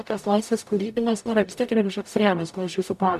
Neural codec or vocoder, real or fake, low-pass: codec, 44.1 kHz, 0.9 kbps, DAC; fake; 14.4 kHz